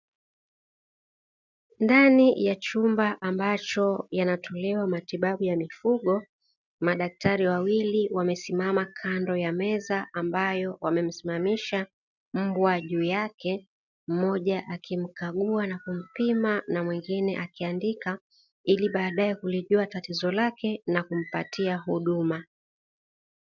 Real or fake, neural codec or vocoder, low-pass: real; none; 7.2 kHz